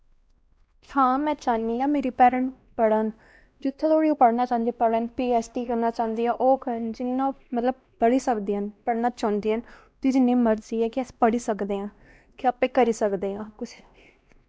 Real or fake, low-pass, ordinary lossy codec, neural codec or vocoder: fake; none; none; codec, 16 kHz, 1 kbps, X-Codec, WavLM features, trained on Multilingual LibriSpeech